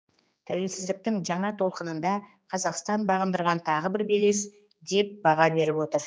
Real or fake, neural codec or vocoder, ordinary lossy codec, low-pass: fake; codec, 16 kHz, 2 kbps, X-Codec, HuBERT features, trained on general audio; none; none